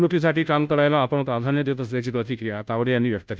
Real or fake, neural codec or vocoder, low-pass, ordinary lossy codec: fake; codec, 16 kHz, 0.5 kbps, FunCodec, trained on Chinese and English, 25 frames a second; none; none